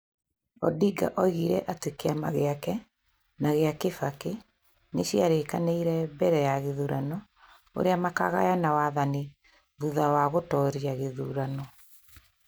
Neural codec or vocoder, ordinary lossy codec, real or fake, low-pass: none; none; real; none